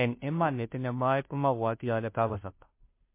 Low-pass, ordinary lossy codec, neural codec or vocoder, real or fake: 3.6 kHz; MP3, 24 kbps; codec, 16 kHz, 0.5 kbps, FunCodec, trained on Chinese and English, 25 frames a second; fake